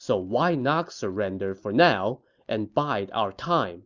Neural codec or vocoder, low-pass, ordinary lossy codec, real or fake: none; 7.2 kHz; Opus, 64 kbps; real